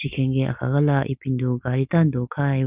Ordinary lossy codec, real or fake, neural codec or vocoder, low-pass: Opus, 24 kbps; real; none; 3.6 kHz